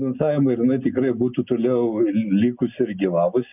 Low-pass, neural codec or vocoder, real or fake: 3.6 kHz; none; real